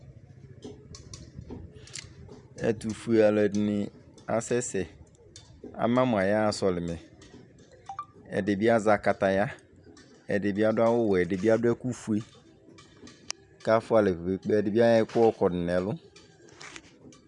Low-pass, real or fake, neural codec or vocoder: 10.8 kHz; fake; vocoder, 44.1 kHz, 128 mel bands every 512 samples, BigVGAN v2